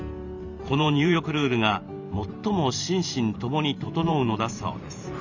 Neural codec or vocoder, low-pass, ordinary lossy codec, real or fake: vocoder, 44.1 kHz, 128 mel bands every 512 samples, BigVGAN v2; 7.2 kHz; none; fake